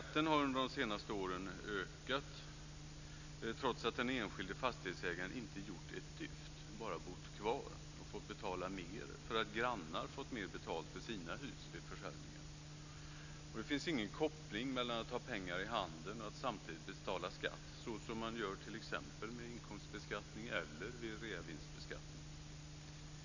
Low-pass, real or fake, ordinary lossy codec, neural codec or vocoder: 7.2 kHz; real; none; none